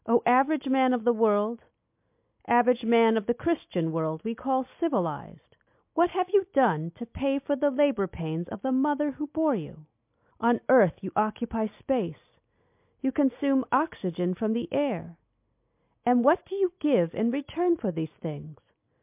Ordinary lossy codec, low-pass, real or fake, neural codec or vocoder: MP3, 32 kbps; 3.6 kHz; real; none